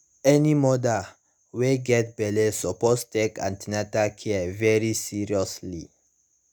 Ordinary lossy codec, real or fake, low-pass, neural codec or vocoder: none; real; none; none